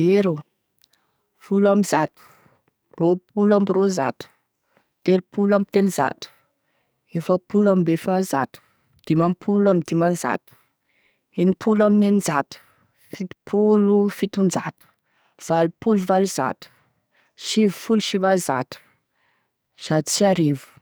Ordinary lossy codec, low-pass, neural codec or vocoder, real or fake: none; none; codec, 44.1 kHz, 2.6 kbps, SNAC; fake